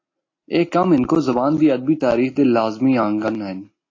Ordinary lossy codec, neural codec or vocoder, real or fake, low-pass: AAC, 32 kbps; none; real; 7.2 kHz